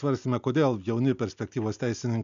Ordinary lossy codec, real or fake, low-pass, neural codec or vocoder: AAC, 96 kbps; real; 7.2 kHz; none